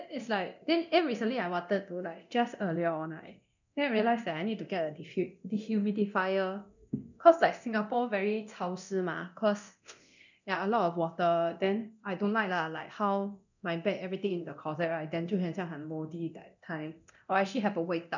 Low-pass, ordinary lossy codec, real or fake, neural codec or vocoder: 7.2 kHz; none; fake; codec, 24 kHz, 0.9 kbps, DualCodec